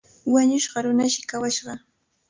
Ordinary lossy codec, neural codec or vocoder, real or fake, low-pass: Opus, 24 kbps; none; real; 7.2 kHz